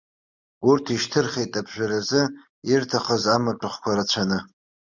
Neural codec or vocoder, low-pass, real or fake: none; 7.2 kHz; real